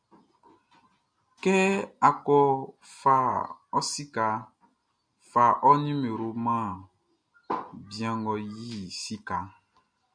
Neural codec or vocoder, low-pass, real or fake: none; 9.9 kHz; real